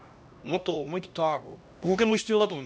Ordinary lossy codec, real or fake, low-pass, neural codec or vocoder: none; fake; none; codec, 16 kHz, 1 kbps, X-Codec, HuBERT features, trained on LibriSpeech